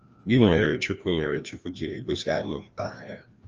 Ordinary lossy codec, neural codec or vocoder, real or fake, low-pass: Opus, 24 kbps; codec, 16 kHz, 1 kbps, FreqCodec, larger model; fake; 7.2 kHz